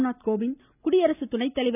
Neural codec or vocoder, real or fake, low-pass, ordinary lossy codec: none; real; 3.6 kHz; none